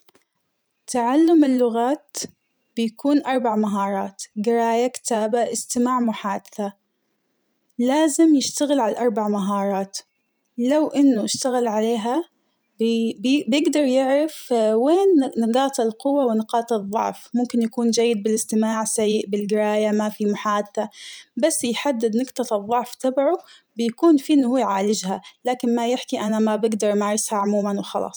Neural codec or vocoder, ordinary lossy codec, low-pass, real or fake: vocoder, 44.1 kHz, 128 mel bands every 512 samples, BigVGAN v2; none; none; fake